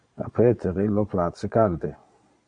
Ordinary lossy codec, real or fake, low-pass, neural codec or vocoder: Opus, 64 kbps; fake; 9.9 kHz; vocoder, 22.05 kHz, 80 mel bands, Vocos